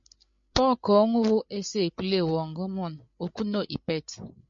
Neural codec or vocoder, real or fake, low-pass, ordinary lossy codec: codec, 16 kHz, 8 kbps, FreqCodec, larger model; fake; 7.2 kHz; MP3, 48 kbps